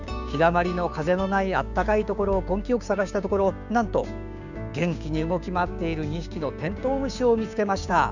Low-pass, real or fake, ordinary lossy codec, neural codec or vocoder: 7.2 kHz; fake; none; codec, 16 kHz, 6 kbps, DAC